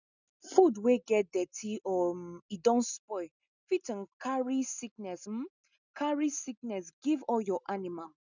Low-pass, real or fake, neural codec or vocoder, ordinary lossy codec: 7.2 kHz; real; none; none